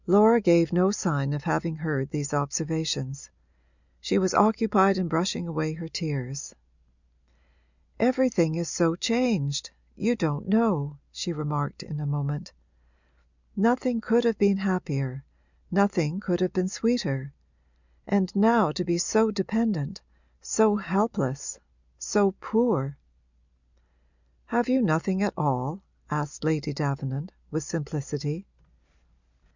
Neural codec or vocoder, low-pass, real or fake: none; 7.2 kHz; real